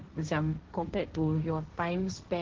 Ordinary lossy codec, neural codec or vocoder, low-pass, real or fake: Opus, 16 kbps; codec, 16 kHz, 1.1 kbps, Voila-Tokenizer; 7.2 kHz; fake